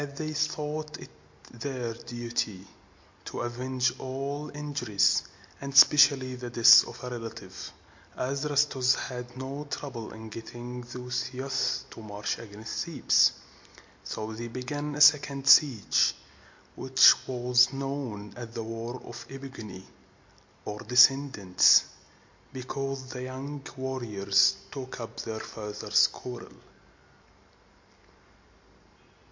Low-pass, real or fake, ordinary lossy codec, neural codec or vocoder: 7.2 kHz; real; MP3, 48 kbps; none